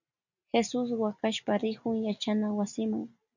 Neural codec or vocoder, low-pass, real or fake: none; 7.2 kHz; real